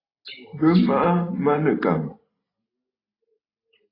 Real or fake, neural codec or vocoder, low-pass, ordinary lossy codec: real; none; 5.4 kHz; AAC, 24 kbps